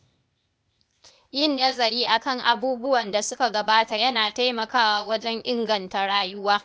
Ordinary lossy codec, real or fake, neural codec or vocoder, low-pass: none; fake; codec, 16 kHz, 0.8 kbps, ZipCodec; none